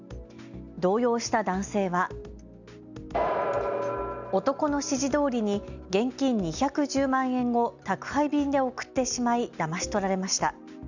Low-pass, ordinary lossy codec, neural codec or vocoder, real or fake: 7.2 kHz; none; none; real